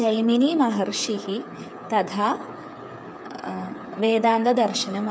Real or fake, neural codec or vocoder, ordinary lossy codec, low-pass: fake; codec, 16 kHz, 8 kbps, FreqCodec, smaller model; none; none